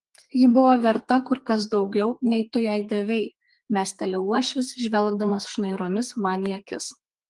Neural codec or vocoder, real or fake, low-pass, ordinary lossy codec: codec, 32 kHz, 1.9 kbps, SNAC; fake; 10.8 kHz; Opus, 24 kbps